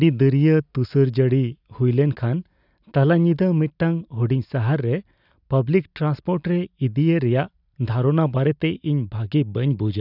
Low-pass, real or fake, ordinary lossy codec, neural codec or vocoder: 5.4 kHz; real; none; none